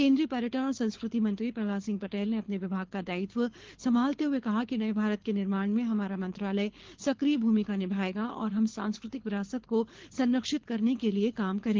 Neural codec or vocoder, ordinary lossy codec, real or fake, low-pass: codec, 24 kHz, 6 kbps, HILCodec; Opus, 16 kbps; fake; 7.2 kHz